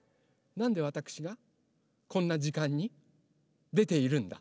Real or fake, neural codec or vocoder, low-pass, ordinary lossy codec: real; none; none; none